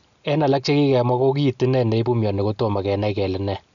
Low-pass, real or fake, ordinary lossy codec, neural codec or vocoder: 7.2 kHz; real; none; none